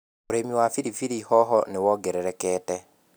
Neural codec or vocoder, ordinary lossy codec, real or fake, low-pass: none; none; real; none